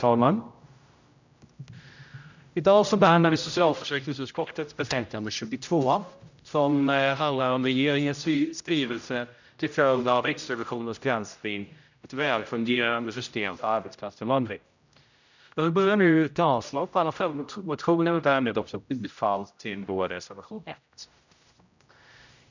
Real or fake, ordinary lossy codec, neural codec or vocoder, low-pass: fake; none; codec, 16 kHz, 0.5 kbps, X-Codec, HuBERT features, trained on general audio; 7.2 kHz